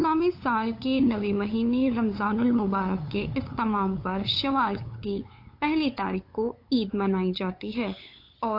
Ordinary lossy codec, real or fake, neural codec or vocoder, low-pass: AAC, 32 kbps; fake; codec, 16 kHz, 8 kbps, FunCodec, trained on LibriTTS, 25 frames a second; 5.4 kHz